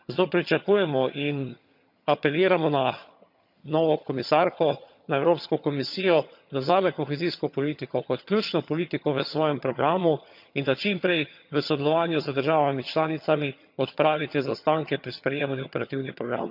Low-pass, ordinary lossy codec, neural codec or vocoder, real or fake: 5.4 kHz; none; vocoder, 22.05 kHz, 80 mel bands, HiFi-GAN; fake